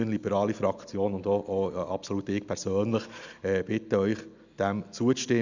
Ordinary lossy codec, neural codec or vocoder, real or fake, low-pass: none; none; real; 7.2 kHz